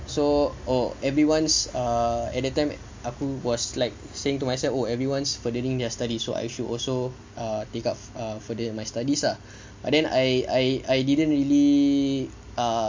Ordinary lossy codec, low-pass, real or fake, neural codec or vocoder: MP3, 48 kbps; 7.2 kHz; real; none